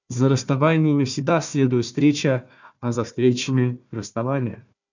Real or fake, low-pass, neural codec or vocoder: fake; 7.2 kHz; codec, 16 kHz, 1 kbps, FunCodec, trained on Chinese and English, 50 frames a second